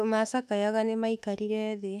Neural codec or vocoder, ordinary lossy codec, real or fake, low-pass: autoencoder, 48 kHz, 32 numbers a frame, DAC-VAE, trained on Japanese speech; none; fake; 14.4 kHz